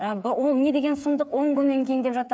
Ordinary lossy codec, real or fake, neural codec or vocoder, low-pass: none; fake; codec, 16 kHz, 8 kbps, FreqCodec, smaller model; none